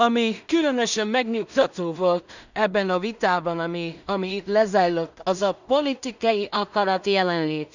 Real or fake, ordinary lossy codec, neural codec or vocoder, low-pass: fake; none; codec, 16 kHz in and 24 kHz out, 0.4 kbps, LongCat-Audio-Codec, two codebook decoder; 7.2 kHz